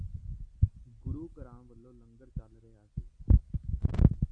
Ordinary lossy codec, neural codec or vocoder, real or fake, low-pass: AAC, 48 kbps; none; real; 9.9 kHz